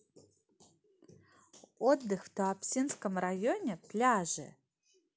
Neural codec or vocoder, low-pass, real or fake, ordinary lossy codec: none; none; real; none